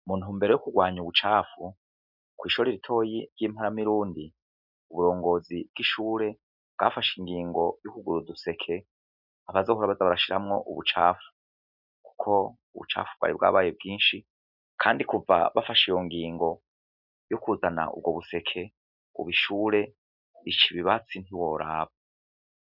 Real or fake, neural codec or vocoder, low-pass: real; none; 5.4 kHz